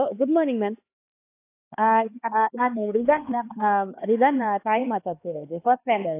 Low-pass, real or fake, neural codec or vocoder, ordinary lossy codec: 3.6 kHz; fake; codec, 16 kHz, 2 kbps, X-Codec, HuBERT features, trained on LibriSpeech; AAC, 24 kbps